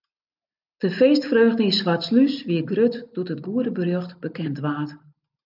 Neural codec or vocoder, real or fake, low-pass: none; real; 5.4 kHz